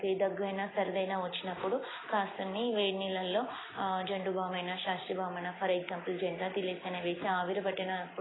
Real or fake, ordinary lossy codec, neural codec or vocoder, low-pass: real; AAC, 16 kbps; none; 7.2 kHz